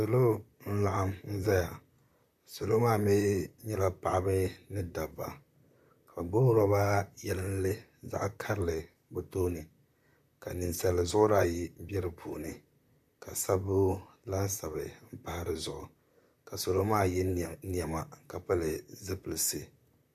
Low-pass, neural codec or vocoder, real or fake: 14.4 kHz; vocoder, 44.1 kHz, 128 mel bands, Pupu-Vocoder; fake